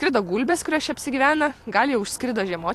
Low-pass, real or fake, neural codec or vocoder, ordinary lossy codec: 14.4 kHz; fake; vocoder, 44.1 kHz, 128 mel bands every 256 samples, BigVGAN v2; AAC, 64 kbps